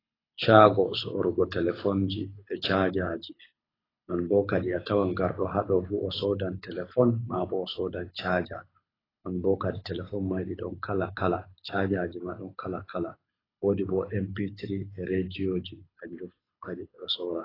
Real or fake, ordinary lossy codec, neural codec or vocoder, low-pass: fake; AAC, 24 kbps; codec, 24 kHz, 6 kbps, HILCodec; 5.4 kHz